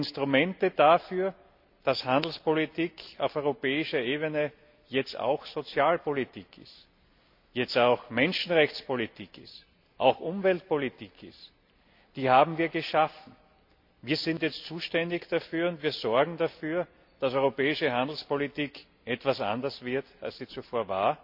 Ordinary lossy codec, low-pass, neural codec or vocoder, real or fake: MP3, 48 kbps; 5.4 kHz; none; real